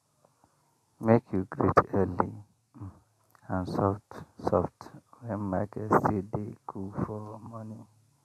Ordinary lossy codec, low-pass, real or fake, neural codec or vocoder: none; 14.4 kHz; real; none